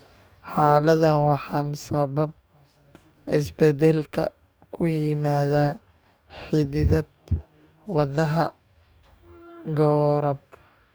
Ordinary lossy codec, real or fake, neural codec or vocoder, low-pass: none; fake; codec, 44.1 kHz, 2.6 kbps, DAC; none